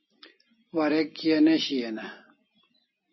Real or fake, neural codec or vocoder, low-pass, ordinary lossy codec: real; none; 7.2 kHz; MP3, 24 kbps